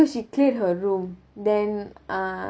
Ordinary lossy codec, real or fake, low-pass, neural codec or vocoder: none; real; none; none